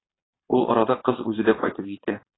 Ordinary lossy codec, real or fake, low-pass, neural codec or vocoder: AAC, 16 kbps; fake; 7.2 kHz; vocoder, 22.05 kHz, 80 mel bands, Vocos